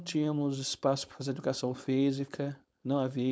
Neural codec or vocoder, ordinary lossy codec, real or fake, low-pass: codec, 16 kHz, 4.8 kbps, FACodec; none; fake; none